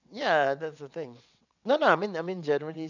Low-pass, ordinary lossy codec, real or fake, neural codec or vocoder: 7.2 kHz; none; fake; vocoder, 22.05 kHz, 80 mel bands, WaveNeXt